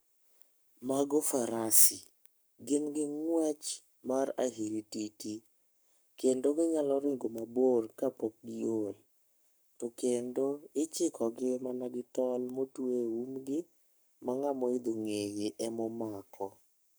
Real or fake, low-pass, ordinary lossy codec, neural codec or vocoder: fake; none; none; codec, 44.1 kHz, 7.8 kbps, Pupu-Codec